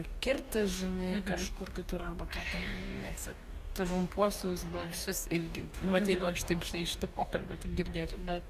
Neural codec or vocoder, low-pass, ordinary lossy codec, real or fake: codec, 44.1 kHz, 2.6 kbps, DAC; 14.4 kHz; Opus, 64 kbps; fake